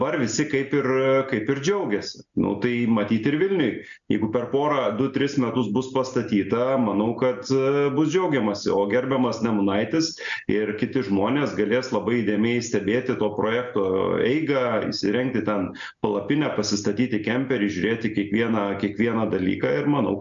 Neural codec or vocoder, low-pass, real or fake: none; 7.2 kHz; real